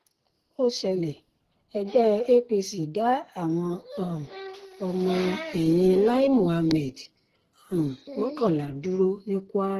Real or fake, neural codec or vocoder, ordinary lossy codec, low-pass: fake; codec, 44.1 kHz, 2.6 kbps, SNAC; Opus, 16 kbps; 14.4 kHz